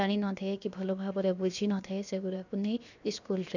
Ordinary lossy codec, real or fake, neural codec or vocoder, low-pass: none; fake; codec, 16 kHz, 0.7 kbps, FocalCodec; 7.2 kHz